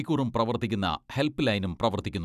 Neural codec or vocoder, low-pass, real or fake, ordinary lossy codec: none; 14.4 kHz; real; none